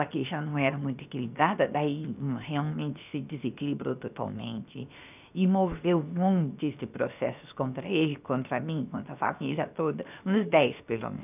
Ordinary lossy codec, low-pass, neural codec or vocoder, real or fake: none; 3.6 kHz; codec, 16 kHz, 0.7 kbps, FocalCodec; fake